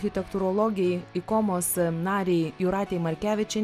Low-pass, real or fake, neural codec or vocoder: 14.4 kHz; real; none